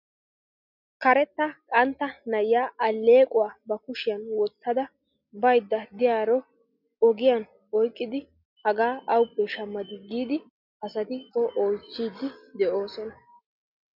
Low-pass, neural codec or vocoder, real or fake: 5.4 kHz; none; real